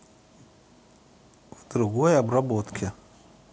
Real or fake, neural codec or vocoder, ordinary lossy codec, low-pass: real; none; none; none